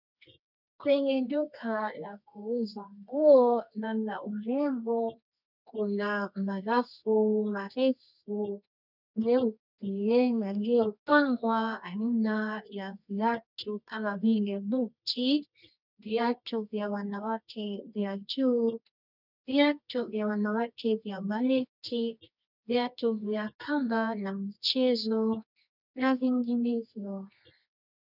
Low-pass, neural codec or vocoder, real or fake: 5.4 kHz; codec, 24 kHz, 0.9 kbps, WavTokenizer, medium music audio release; fake